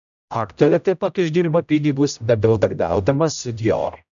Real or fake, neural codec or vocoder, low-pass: fake; codec, 16 kHz, 0.5 kbps, X-Codec, HuBERT features, trained on general audio; 7.2 kHz